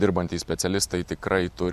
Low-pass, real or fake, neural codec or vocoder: 14.4 kHz; real; none